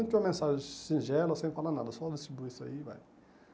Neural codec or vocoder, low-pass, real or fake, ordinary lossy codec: none; none; real; none